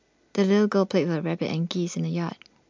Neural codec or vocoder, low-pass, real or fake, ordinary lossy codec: none; 7.2 kHz; real; MP3, 64 kbps